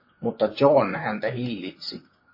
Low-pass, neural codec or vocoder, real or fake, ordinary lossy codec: 5.4 kHz; vocoder, 44.1 kHz, 128 mel bands, Pupu-Vocoder; fake; MP3, 24 kbps